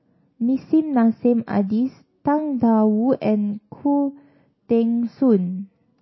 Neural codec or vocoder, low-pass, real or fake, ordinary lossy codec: none; 7.2 kHz; real; MP3, 24 kbps